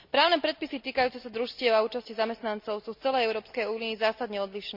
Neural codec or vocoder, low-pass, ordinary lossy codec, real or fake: none; 5.4 kHz; MP3, 48 kbps; real